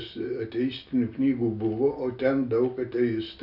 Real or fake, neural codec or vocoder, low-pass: real; none; 5.4 kHz